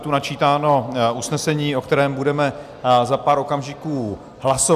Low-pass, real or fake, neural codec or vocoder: 14.4 kHz; real; none